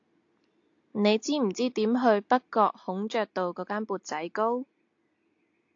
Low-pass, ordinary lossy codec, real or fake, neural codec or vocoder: 7.2 kHz; AAC, 48 kbps; real; none